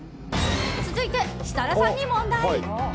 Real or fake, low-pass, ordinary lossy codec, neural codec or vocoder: real; none; none; none